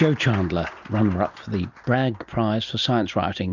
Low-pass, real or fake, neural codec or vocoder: 7.2 kHz; real; none